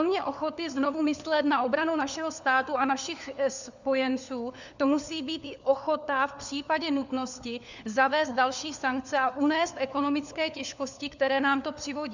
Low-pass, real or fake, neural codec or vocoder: 7.2 kHz; fake; codec, 16 kHz, 4 kbps, FunCodec, trained on LibriTTS, 50 frames a second